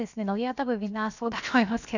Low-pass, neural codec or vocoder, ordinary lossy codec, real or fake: 7.2 kHz; codec, 16 kHz, about 1 kbps, DyCAST, with the encoder's durations; none; fake